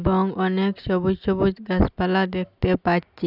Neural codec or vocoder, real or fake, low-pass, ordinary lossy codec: none; real; 5.4 kHz; none